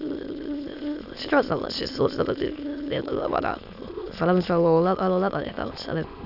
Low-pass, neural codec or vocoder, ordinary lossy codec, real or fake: 5.4 kHz; autoencoder, 22.05 kHz, a latent of 192 numbers a frame, VITS, trained on many speakers; none; fake